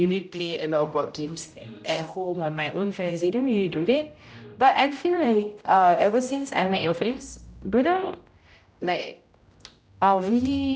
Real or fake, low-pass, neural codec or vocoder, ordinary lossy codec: fake; none; codec, 16 kHz, 0.5 kbps, X-Codec, HuBERT features, trained on general audio; none